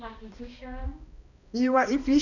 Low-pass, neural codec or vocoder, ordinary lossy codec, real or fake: 7.2 kHz; codec, 16 kHz, 1 kbps, X-Codec, HuBERT features, trained on balanced general audio; none; fake